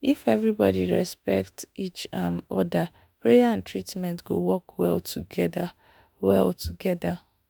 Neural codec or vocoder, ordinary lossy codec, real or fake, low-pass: autoencoder, 48 kHz, 32 numbers a frame, DAC-VAE, trained on Japanese speech; none; fake; none